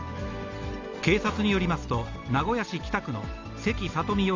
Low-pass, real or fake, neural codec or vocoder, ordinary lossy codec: 7.2 kHz; real; none; Opus, 32 kbps